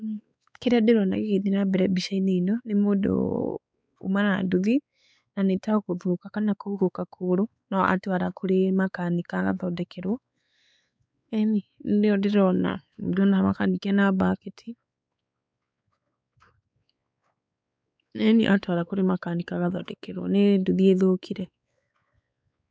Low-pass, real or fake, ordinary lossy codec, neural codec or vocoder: none; fake; none; codec, 16 kHz, 4 kbps, X-Codec, WavLM features, trained on Multilingual LibriSpeech